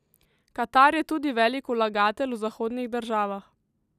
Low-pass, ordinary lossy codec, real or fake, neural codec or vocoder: 14.4 kHz; none; real; none